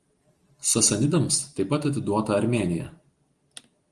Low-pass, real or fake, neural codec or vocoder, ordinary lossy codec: 10.8 kHz; real; none; Opus, 24 kbps